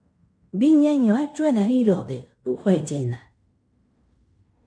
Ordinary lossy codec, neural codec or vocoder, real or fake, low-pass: MP3, 64 kbps; codec, 16 kHz in and 24 kHz out, 0.9 kbps, LongCat-Audio-Codec, fine tuned four codebook decoder; fake; 9.9 kHz